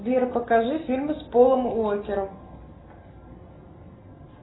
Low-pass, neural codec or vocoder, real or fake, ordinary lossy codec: 7.2 kHz; none; real; AAC, 16 kbps